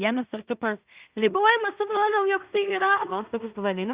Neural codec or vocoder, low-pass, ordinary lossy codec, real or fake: codec, 16 kHz in and 24 kHz out, 0.4 kbps, LongCat-Audio-Codec, two codebook decoder; 3.6 kHz; Opus, 64 kbps; fake